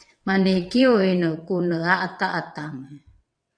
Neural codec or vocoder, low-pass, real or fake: vocoder, 22.05 kHz, 80 mel bands, WaveNeXt; 9.9 kHz; fake